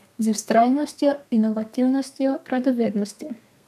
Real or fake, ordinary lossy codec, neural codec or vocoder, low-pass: fake; none; codec, 32 kHz, 1.9 kbps, SNAC; 14.4 kHz